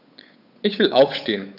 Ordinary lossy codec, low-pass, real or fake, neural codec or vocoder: none; 5.4 kHz; fake; codec, 16 kHz, 8 kbps, FunCodec, trained on Chinese and English, 25 frames a second